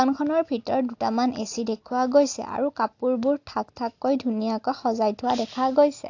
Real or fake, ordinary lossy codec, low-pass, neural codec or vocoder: real; AAC, 48 kbps; 7.2 kHz; none